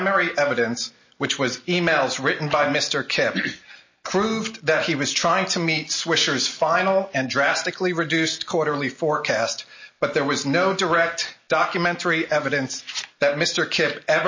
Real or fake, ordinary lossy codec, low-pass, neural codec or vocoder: real; MP3, 32 kbps; 7.2 kHz; none